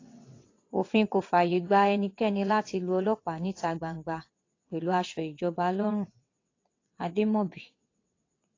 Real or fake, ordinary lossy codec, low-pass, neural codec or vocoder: fake; AAC, 32 kbps; 7.2 kHz; vocoder, 22.05 kHz, 80 mel bands, WaveNeXt